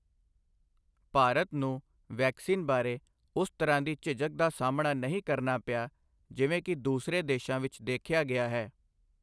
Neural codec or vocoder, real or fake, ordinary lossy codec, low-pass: none; real; none; none